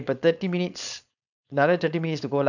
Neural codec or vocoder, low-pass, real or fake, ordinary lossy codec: codec, 16 kHz, 4.8 kbps, FACodec; 7.2 kHz; fake; none